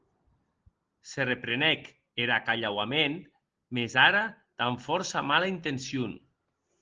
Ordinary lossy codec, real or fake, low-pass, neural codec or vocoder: Opus, 16 kbps; real; 7.2 kHz; none